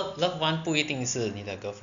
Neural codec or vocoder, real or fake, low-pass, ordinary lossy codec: none; real; 7.2 kHz; none